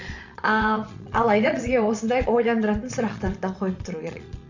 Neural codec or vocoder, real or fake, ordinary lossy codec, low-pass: vocoder, 22.05 kHz, 80 mel bands, Vocos; fake; Opus, 64 kbps; 7.2 kHz